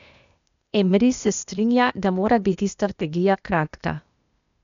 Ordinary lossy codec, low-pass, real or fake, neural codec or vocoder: none; 7.2 kHz; fake; codec, 16 kHz, 0.8 kbps, ZipCodec